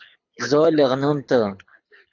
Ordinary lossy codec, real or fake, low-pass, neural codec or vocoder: AAC, 48 kbps; fake; 7.2 kHz; codec, 24 kHz, 6 kbps, HILCodec